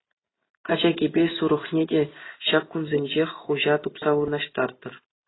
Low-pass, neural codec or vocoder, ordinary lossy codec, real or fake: 7.2 kHz; none; AAC, 16 kbps; real